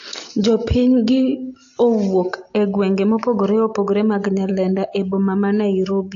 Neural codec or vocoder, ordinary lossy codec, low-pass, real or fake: none; AAC, 48 kbps; 7.2 kHz; real